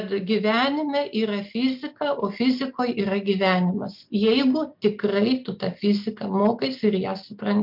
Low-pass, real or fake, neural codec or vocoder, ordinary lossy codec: 5.4 kHz; real; none; MP3, 48 kbps